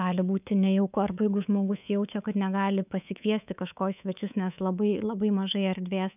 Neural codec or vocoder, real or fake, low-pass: codec, 24 kHz, 3.1 kbps, DualCodec; fake; 3.6 kHz